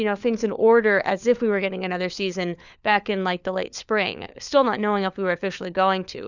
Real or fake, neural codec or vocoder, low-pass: fake; codec, 16 kHz, 4 kbps, FunCodec, trained on LibriTTS, 50 frames a second; 7.2 kHz